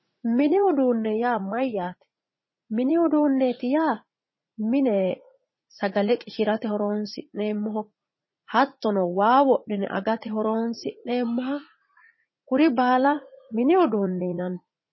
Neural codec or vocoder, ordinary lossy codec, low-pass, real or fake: vocoder, 44.1 kHz, 80 mel bands, Vocos; MP3, 24 kbps; 7.2 kHz; fake